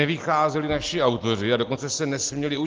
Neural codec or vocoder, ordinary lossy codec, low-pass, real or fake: none; Opus, 16 kbps; 7.2 kHz; real